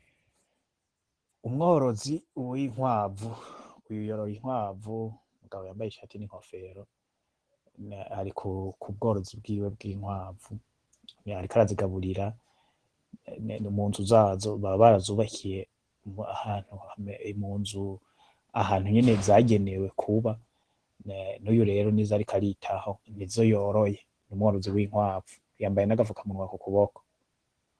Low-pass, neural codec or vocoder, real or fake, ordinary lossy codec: 10.8 kHz; none; real; Opus, 16 kbps